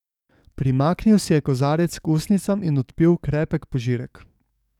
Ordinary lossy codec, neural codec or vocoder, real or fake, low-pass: none; codec, 44.1 kHz, 7.8 kbps, DAC; fake; 19.8 kHz